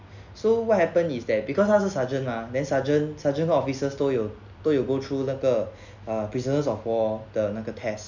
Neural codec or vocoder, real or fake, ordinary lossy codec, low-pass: none; real; none; 7.2 kHz